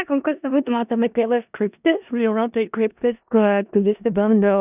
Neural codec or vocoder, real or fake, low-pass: codec, 16 kHz in and 24 kHz out, 0.4 kbps, LongCat-Audio-Codec, four codebook decoder; fake; 3.6 kHz